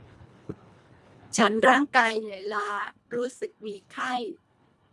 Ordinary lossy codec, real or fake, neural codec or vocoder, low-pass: none; fake; codec, 24 kHz, 1.5 kbps, HILCodec; none